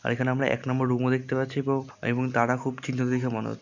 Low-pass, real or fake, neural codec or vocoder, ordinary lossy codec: 7.2 kHz; real; none; none